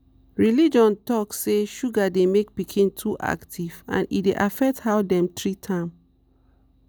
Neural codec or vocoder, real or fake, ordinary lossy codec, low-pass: none; real; none; none